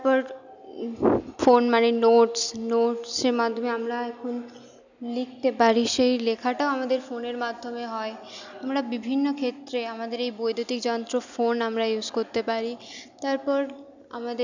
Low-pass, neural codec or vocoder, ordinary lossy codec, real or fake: 7.2 kHz; none; none; real